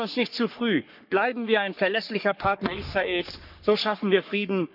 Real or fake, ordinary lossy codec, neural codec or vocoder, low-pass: fake; none; codec, 44.1 kHz, 3.4 kbps, Pupu-Codec; 5.4 kHz